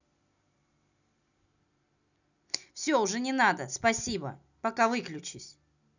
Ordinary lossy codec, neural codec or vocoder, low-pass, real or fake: none; none; 7.2 kHz; real